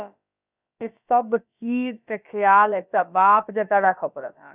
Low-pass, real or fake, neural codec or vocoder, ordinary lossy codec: 3.6 kHz; fake; codec, 16 kHz, about 1 kbps, DyCAST, with the encoder's durations; none